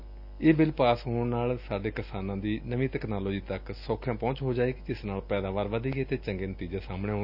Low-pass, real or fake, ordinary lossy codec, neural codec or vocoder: 5.4 kHz; real; none; none